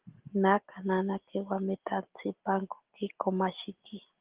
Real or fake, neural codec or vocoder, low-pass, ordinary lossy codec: real; none; 3.6 kHz; Opus, 16 kbps